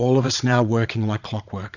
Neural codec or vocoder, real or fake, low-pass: vocoder, 22.05 kHz, 80 mel bands, Vocos; fake; 7.2 kHz